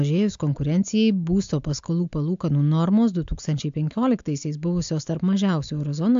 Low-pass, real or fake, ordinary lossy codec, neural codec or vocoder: 7.2 kHz; real; AAC, 64 kbps; none